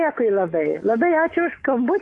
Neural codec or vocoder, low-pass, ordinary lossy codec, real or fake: codec, 44.1 kHz, 7.8 kbps, DAC; 10.8 kHz; AAC, 48 kbps; fake